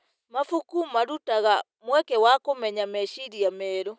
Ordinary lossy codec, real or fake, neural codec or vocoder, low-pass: none; real; none; none